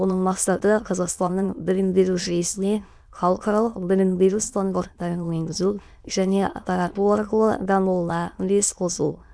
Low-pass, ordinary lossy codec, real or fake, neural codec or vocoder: none; none; fake; autoencoder, 22.05 kHz, a latent of 192 numbers a frame, VITS, trained on many speakers